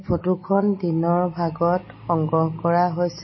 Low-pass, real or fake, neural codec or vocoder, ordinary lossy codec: 7.2 kHz; real; none; MP3, 24 kbps